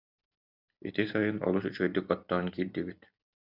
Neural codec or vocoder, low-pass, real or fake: none; 5.4 kHz; real